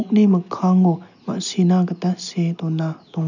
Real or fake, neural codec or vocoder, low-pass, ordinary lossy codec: real; none; 7.2 kHz; none